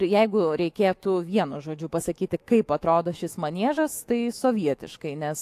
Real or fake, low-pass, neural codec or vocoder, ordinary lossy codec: fake; 14.4 kHz; autoencoder, 48 kHz, 128 numbers a frame, DAC-VAE, trained on Japanese speech; AAC, 64 kbps